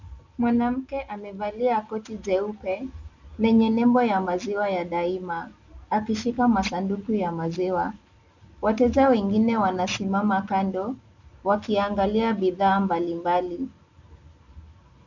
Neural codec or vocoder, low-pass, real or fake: none; 7.2 kHz; real